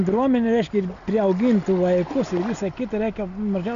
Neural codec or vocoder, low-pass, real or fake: none; 7.2 kHz; real